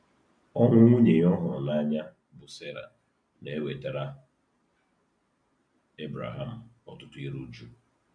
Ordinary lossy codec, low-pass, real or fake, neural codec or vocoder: none; 9.9 kHz; real; none